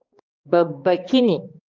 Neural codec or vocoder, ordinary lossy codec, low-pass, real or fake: codec, 16 kHz, 2 kbps, X-Codec, HuBERT features, trained on balanced general audio; Opus, 32 kbps; 7.2 kHz; fake